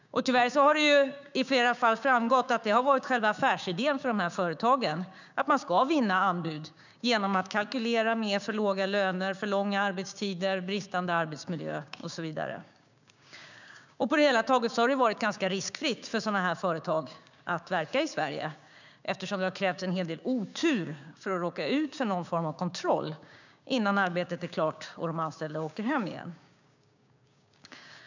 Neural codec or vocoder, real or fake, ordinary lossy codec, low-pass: codec, 16 kHz, 6 kbps, DAC; fake; none; 7.2 kHz